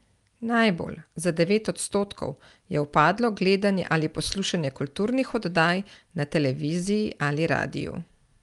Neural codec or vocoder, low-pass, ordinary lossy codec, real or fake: none; 10.8 kHz; Opus, 32 kbps; real